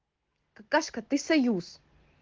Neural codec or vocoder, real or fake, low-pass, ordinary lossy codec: none; real; 7.2 kHz; Opus, 16 kbps